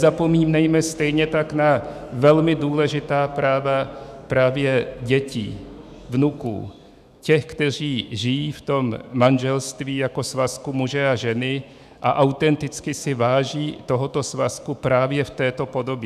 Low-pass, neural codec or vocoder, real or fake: 14.4 kHz; autoencoder, 48 kHz, 128 numbers a frame, DAC-VAE, trained on Japanese speech; fake